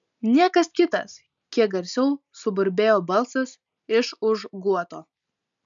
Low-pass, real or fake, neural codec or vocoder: 7.2 kHz; real; none